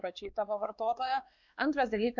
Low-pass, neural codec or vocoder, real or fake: 7.2 kHz; codec, 16 kHz, 2 kbps, X-Codec, WavLM features, trained on Multilingual LibriSpeech; fake